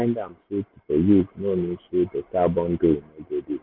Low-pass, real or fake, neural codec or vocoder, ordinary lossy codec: 5.4 kHz; real; none; MP3, 48 kbps